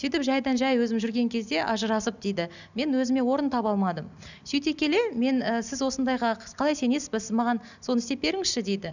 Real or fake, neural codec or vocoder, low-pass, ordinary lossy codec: real; none; 7.2 kHz; none